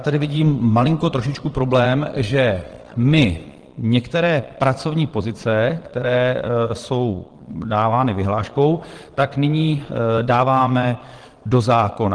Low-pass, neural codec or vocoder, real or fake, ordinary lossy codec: 9.9 kHz; vocoder, 22.05 kHz, 80 mel bands, Vocos; fake; Opus, 16 kbps